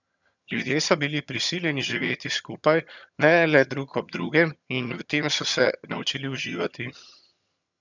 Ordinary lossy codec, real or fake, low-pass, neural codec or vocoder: none; fake; 7.2 kHz; vocoder, 22.05 kHz, 80 mel bands, HiFi-GAN